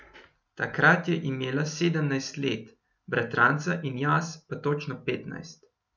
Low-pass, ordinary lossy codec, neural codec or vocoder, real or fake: 7.2 kHz; none; none; real